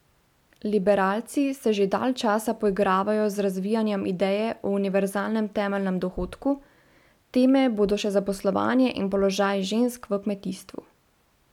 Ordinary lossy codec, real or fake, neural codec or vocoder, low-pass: none; real; none; 19.8 kHz